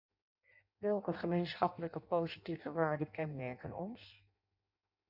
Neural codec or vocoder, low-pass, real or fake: codec, 16 kHz in and 24 kHz out, 0.6 kbps, FireRedTTS-2 codec; 5.4 kHz; fake